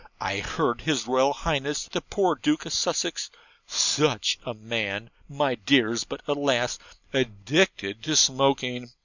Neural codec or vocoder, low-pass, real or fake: none; 7.2 kHz; real